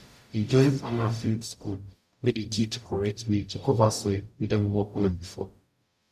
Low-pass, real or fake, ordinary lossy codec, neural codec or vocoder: 14.4 kHz; fake; none; codec, 44.1 kHz, 0.9 kbps, DAC